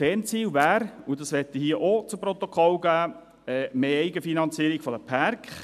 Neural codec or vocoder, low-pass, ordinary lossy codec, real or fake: none; 14.4 kHz; none; real